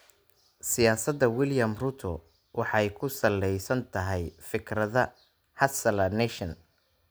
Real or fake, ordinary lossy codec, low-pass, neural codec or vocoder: real; none; none; none